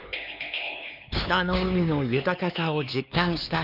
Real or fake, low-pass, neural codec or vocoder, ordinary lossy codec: fake; 5.4 kHz; codec, 16 kHz, 4 kbps, X-Codec, HuBERT features, trained on LibriSpeech; none